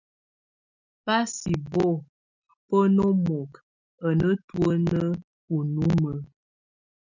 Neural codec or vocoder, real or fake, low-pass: none; real; 7.2 kHz